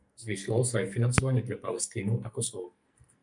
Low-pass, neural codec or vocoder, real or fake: 10.8 kHz; codec, 44.1 kHz, 2.6 kbps, SNAC; fake